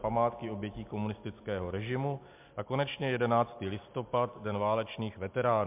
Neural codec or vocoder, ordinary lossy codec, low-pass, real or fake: none; MP3, 32 kbps; 3.6 kHz; real